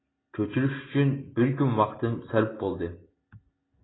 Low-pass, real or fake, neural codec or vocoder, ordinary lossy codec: 7.2 kHz; real; none; AAC, 16 kbps